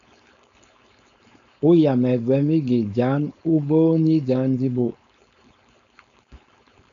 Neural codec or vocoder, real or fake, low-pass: codec, 16 kHz, 4.8 kbps, FACodec; fake; 7.2 kHz